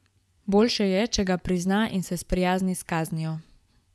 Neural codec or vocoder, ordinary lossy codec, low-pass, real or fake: vocoder, 24 kHz, 100 mel bands, Vocos; none; none; fake